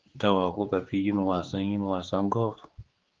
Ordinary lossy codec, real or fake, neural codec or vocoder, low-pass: Opus, 16 kbps; fake; codec, 16 kHz, 4 kbps, X-Codec, HuBERT features, trained on general audio; 7.2 kHz